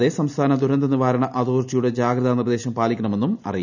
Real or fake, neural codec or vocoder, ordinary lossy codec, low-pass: real; none; none; 7.2 kHz